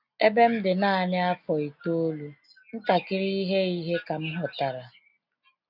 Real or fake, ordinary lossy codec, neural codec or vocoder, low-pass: real; none; none; 5.4 kHz